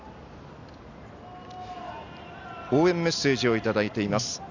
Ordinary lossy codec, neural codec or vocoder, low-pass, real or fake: none; none; 7.2 kHz; real